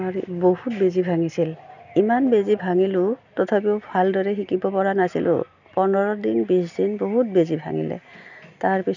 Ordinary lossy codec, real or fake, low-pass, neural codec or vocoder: none; real; 7.2 kHz; none